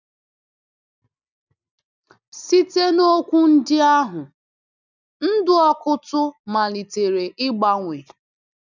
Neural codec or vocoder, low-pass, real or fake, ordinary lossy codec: none; 7.2 kHz; real; none